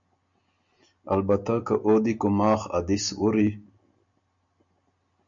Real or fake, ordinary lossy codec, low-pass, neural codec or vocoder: real; MP3, 64 kbps; 7.2 kHz; none